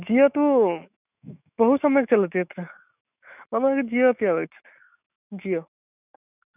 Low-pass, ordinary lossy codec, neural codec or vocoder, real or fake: 3.6 kHz; none; autoencoder, 48 kHz, 128 numbers a frame, DAC-VAE, trained on Japanese speech; fake